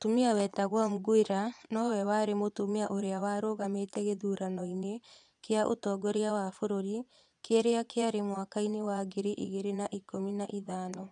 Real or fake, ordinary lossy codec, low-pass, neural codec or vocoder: fake; none; 9.9 kHz; vocoder, 22.05 kHz, 80 mel bands, Vocos